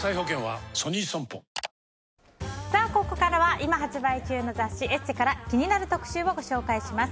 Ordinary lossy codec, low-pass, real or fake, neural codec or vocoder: none; none; real; none